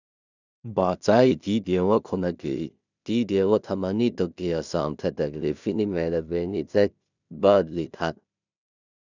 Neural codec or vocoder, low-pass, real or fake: codec, 16 kHz in and 24 kHz out, 0.4 kbps, LongCat-Audio-Codec, two codebook decoder; 7.2 kHz; fake